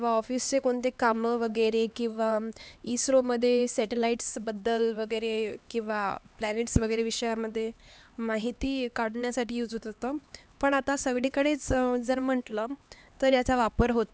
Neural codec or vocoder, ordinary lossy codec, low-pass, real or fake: codec, 16 kHz, 2 kbps, X-Codec, HuBERT features, trained on LibriSpeech; none; none; fake